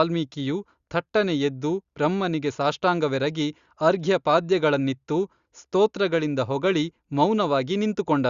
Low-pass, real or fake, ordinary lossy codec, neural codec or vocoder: 7.2 kHz; real; Opus, 64 kbps; none